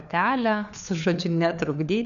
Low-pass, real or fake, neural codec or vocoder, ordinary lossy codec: 7.2 kHz; fake; codec, 16 kHz, 4 kbps, FunCodec, trained on LibriTTS, 50 frames a second; MP3, 96 kbps